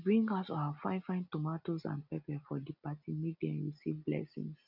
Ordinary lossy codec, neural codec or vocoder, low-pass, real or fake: none; none; 5.4 kHz; real